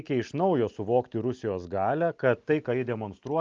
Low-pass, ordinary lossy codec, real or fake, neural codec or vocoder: 7.2 kHz; Opus, 24 kbps; real; none